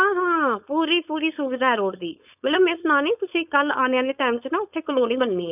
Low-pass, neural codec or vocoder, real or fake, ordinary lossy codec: 3.6 kHz; codec, 16 kHz, 4.8 kbps, FACodec; fake; none